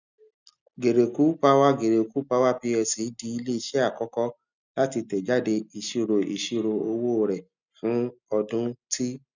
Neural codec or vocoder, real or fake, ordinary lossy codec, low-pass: none; real; none; 7.2 kHz